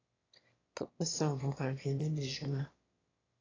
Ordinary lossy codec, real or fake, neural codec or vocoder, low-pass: AAC, 32 kbps; fake; autoencoder, 22.05 kHz, a latent of 192 numbers a frame, VITS, trained on one speaker; 7.2 kHz